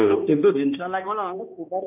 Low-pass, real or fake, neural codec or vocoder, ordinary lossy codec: 3.6 kHz; fake; codec, 16 kHz, 1 kbps, X-Codec, HuBERT features, trained on balanced general audio; none